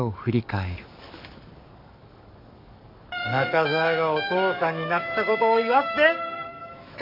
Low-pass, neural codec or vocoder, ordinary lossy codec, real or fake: 5.4 kHz; codec, 44.1 kHz, 7.8 kbps, DAC; MP3, 48 kbps; fake